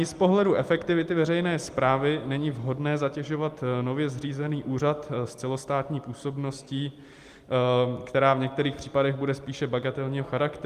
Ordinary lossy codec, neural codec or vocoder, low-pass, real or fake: Opus, 32 kbps; none; 14.4 kHz; real